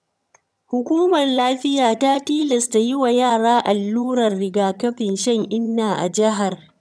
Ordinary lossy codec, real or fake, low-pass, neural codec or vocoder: none; fake; none; vocoder, 22.05 kHz, 80 mel bands, HiFi-GAN